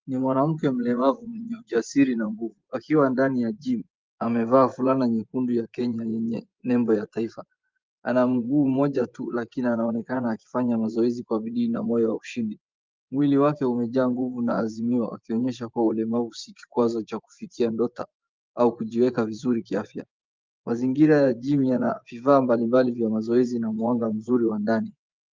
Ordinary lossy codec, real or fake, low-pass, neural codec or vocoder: Opus, 24 kbps; fake; 7.2 kHz; vocoder, 24 kHz, 100 mel bands, Vocos